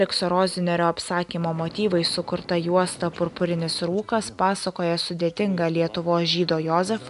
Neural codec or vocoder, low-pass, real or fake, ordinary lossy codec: none; 10.8 kHz; real; AAC, 96 kbps